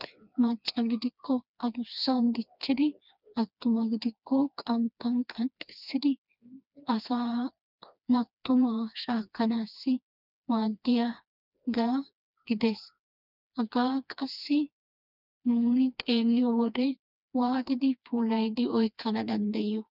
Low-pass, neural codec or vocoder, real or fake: 5.4 kHz; codec, 16 kHz, 2 kbps, FreqCodec, smaller model; fake